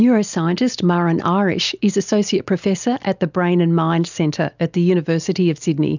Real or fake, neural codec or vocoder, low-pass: real; none; 7.2 kHz